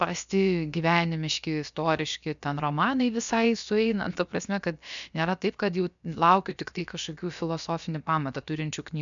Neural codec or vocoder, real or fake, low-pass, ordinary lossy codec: codec, 16 kHz, about 1 kbps, DyCAST, with the encoder's durations; fake; 7.2 kHz; MP3, 96 kbps